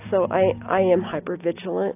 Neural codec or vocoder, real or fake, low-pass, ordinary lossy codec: none; real; 3.6 kHz; AAC, 24 kbps